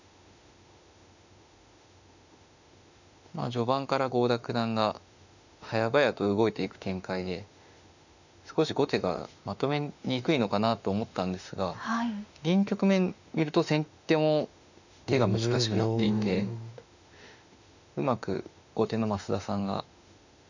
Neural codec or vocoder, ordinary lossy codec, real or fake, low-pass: autoencoder, 48 kHz, 32 numbers a frame, DAC-VAE, trained on Japanese speech; none; fake; 7.2 kHz